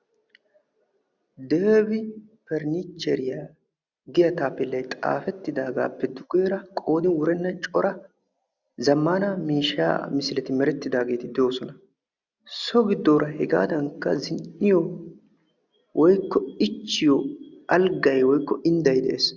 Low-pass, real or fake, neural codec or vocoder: 7.2 kHz; real; none